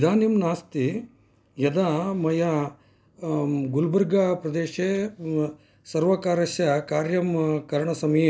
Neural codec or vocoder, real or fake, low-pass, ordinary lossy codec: none; real; none; none